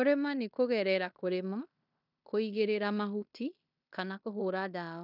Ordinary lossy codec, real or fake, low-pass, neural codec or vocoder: none; fake; 5.4 kHz; codec, 16 kHz in and 24 kHz out, 0.9 kbps, LongCat-Audio-Codec, fine tuned four codebook decoder